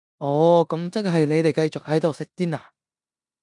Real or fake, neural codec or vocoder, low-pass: fake; codec, 16 kHz in and 24 kHz out, 0.9 kbps, LongCat-Audio-Codec, four codebook decoder; 10.8 kHz